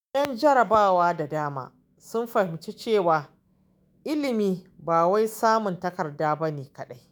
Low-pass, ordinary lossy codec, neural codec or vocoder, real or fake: none; none; autoencoder, 48 kHz, 128 numbers a frame, DAC-VAE, trained on Japanese speech; fake